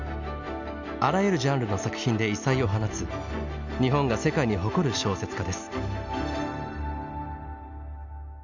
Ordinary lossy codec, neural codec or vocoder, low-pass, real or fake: none; none; 7.2 kHz; real